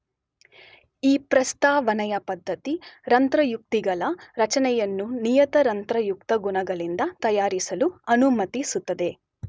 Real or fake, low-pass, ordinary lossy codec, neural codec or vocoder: real; none; none; none